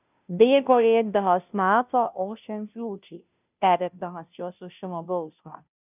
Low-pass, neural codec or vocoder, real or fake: 3.6 kHz; codec, 16 kHz, 0.5 kbps, FunCodec, trained on Chinese and English, 25 frames a second; fake